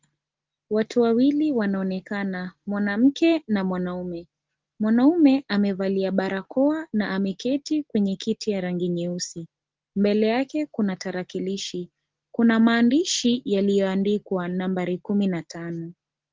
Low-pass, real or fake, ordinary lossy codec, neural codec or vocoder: 7.2 kHz; real; Opus, 16 kbps; none